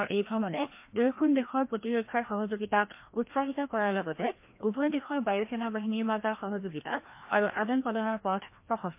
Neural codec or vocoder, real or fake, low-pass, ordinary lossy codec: codec, 16 kHz, 1 kbps, FreqCodec, larger model; fake; 3.6 kHz; MP3, 24 kbps